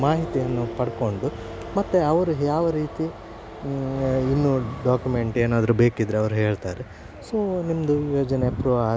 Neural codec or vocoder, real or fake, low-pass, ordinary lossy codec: none; real; none; none